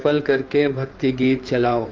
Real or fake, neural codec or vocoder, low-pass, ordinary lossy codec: fake; codec, 16 kHz in and 24 kHz out, 2.2 kbps, FireRedTTS-2 codec; 7.2 kHz; Opus, 24 kbps